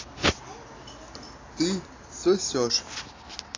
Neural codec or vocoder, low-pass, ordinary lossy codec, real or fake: none; 7.2 kHz; AAC, 32 kbps; real